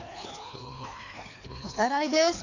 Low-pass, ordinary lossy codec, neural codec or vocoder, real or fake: 7.2 kHz; AAC, 48 kbps; codec, 24 kHz, 3 kbps, HILCodec; fake